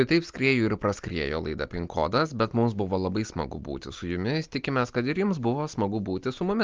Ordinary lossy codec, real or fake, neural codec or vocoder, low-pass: Opus, 24 kbps; real; none; 7.2 kHz